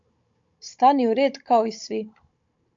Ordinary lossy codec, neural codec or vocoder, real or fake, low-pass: AAC, 64 kbps; codec, 16 kHz, 16 kbps, FunCodec, trained on Chinese and English, 50 frames a second; fake; 7.2 kHz